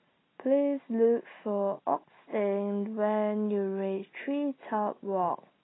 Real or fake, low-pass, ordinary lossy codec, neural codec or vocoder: real; 7.2 kHz; AAC, 16 kbps; none